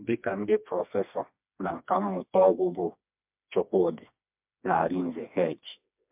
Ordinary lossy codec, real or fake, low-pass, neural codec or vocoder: MP3, 32 kbps; fake; 3.6 kHz; codec, 16 kHz, 2 kbps, FreqCodec, smaller model